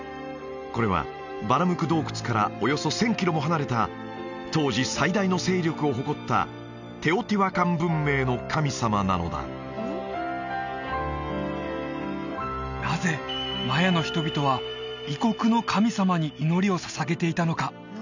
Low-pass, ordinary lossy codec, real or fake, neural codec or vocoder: 7.2 kHz; none; real; none